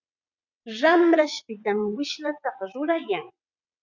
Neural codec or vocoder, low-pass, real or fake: vocoder, 22.05 kHz, 80 mel bands, WaveNeXt; 7.2 kHz; fake